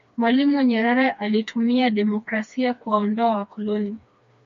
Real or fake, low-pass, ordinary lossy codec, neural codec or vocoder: fake; 7.2 kHz; MP3, 48 kbps; codec, 16 kHz, 2 kbps, FreqCodec, smaller model